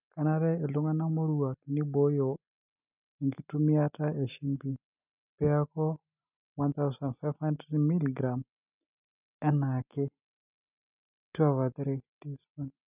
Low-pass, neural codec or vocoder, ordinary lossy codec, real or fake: 3.6 kHz; none; none; real